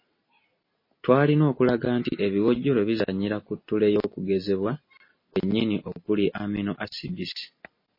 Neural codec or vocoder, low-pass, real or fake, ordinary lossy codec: none; 5.4 kHz; real; MP3, 24 kbps